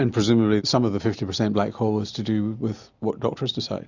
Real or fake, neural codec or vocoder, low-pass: real; none; 7.2 kHz